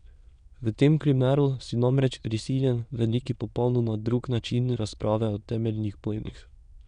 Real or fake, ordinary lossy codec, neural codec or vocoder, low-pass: fake; none; autoencoder, 22.05 kHz, a latent of 192 numbers a frame, VITS, trained on many speakers; 9.9 kHz